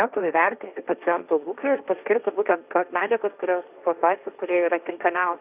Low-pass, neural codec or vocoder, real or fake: 3.6 kHz; codec, 16 kHz, 1.1 kbps, Voila-Tokenizer; fake